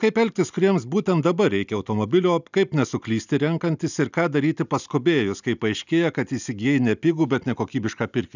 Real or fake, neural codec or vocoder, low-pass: real; none; 7.2 kHz